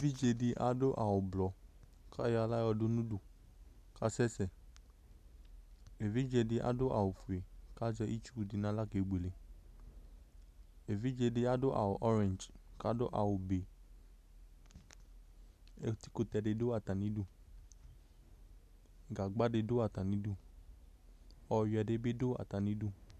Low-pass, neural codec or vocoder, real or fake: 14.4 kHz; none; real